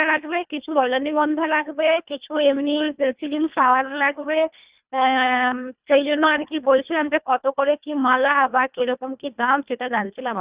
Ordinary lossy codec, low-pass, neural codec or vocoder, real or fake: Opus, 64 kbps; 3.6 kHz; codec, 24 kHz, 1.5 kbps, HILCodec; fake